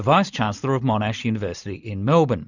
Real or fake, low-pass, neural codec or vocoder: real; 7.2 kHz; none